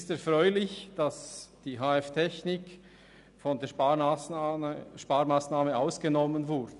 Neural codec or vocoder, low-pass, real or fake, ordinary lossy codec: none; 10.8 kHz; real; none